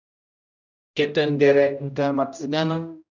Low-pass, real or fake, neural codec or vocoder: 7.2 kHz; fake; codec, 16 kHz, 0.5 kbps, X-Codec, HuBERT features, trained on general audio